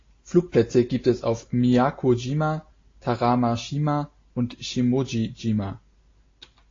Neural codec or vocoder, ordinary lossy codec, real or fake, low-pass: none; AAC, 32 kbps; real; 7.2 kHz